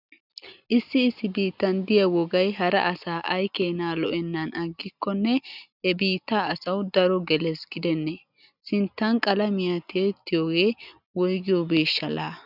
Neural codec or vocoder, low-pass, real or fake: none; 5.4 kHz; real